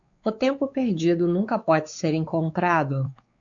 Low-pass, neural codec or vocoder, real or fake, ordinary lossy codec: 7.2 kHz; codec, 16 kHz, 4 kbps, X-Codec, WavLM features, trained on Multilingual LibriSpeech; fake; MP3, 48 kbps